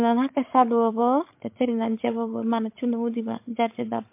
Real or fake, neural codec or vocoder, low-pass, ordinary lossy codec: fake; codec, 16 kHz, 16 kbps, FreqCodec, larger model; 3.6 kHz; MP3, 24 kbps